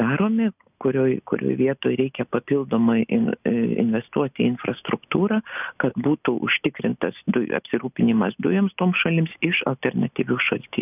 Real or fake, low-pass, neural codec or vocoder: real; 3.6 kHz; none